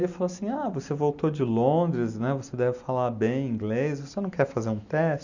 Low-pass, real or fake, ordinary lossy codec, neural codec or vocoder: 7.2 kHz; real; none; none